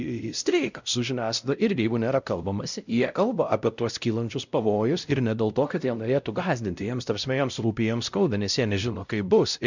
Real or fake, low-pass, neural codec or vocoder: fake; 7.2 kHz; codec, 16 kHz, 0.5 kbps, X-Codec, WavLM features, trained on Multilingual LibriSpeech